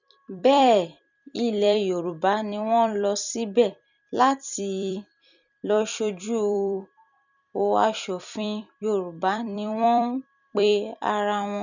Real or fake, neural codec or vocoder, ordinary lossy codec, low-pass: fake; vocoder, 44.1 kHz, 128 mel bands every 256 samples, BigVGAN v2; none; 7.2 kHz